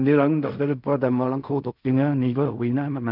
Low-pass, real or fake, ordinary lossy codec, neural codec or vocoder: 5.4 kHz; fake; none; codec, 16 kHz in and 24 kHz out, 0.4 kbps, LongCat-Audio-Codec, fine tuned four codebook decoder